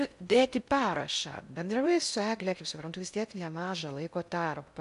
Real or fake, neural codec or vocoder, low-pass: fake; codec, 16 kHz in and 24 kHz out, 0.6 kbps, FocalCodec, streaming, 4096 codes; 10.8 kHz